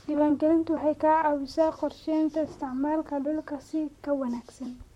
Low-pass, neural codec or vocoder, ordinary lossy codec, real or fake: 19.8 kHz; vocoder, 44.1 kHz, 128 mel bands, Pupu-Vocoder; MP3, 64 kbps; fake